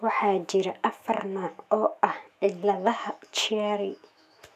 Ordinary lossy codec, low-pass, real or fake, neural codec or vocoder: none; 14.4 kHz; fake; autoencoder, 48 kHz, 128 numbers a frame, DAC-VAE, trained on Japanese speech